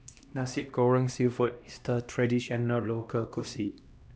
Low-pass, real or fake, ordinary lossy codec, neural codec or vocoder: none; fake; none; codec, 16 kHz, 1 kbps, X-Codec, HuBERT features, trained on LibriSpeech